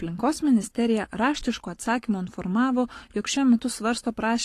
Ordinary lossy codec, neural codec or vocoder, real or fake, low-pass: AAC, 48 kbps; codec, 44.1 kHz, 7.8 kbps, Pupu-Codec; fake; 14.4 kHz